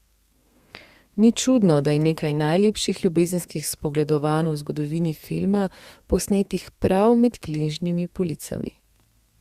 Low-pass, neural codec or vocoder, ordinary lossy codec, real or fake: 14.4 kHz; codec, 32 kHz, 1.9 kbps, SNAC; Opus, 64 kbps; fake